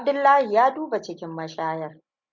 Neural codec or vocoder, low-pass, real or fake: none; 7.2 kHz; real